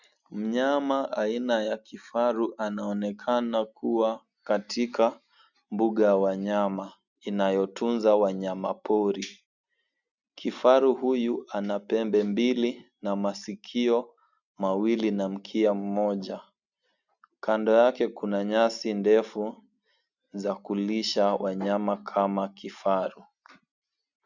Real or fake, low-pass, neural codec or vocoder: real; 7.2 kHz; none